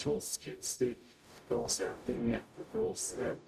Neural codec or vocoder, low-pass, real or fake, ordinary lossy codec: codec, 44.1 kHz, 0.9 kbps, DAC; 14.4 kHz; fake; none